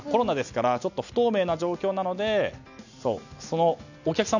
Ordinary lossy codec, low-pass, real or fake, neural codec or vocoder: none; 7.2 kHz; real; none